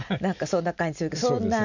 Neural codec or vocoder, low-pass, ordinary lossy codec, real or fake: none; 7.2 kHz; none; real